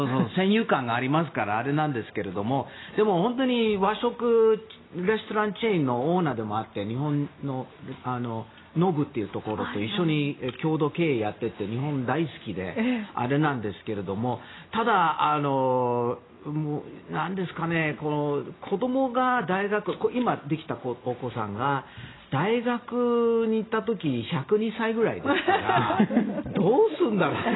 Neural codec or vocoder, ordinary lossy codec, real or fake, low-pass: none; AAC, 16 kbps; real; 7.2 kHz